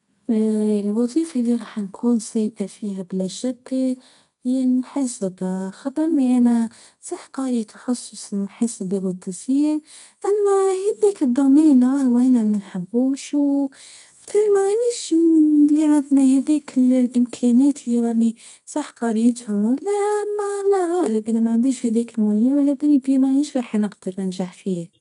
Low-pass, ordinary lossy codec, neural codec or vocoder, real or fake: 10.8 kHz; none; codec, 24 kHz, 0.9 kbps, WavTokenizer, medium music audio release; fake